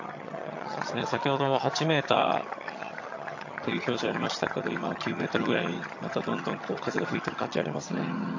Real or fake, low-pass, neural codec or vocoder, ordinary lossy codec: fake; 7.2 kHz; vocoder, 22.05 kHz, 80 mel bands, HiFi-GAN; AAC, 48 kbps